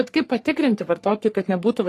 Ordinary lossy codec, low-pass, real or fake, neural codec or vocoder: AAC, 48 kbps; 14.4 kHz; fake; codec, 44.1 kHz, 3.4 kbps, Pupu-Codec